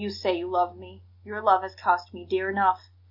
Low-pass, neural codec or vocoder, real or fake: 5.4 kHz; none; real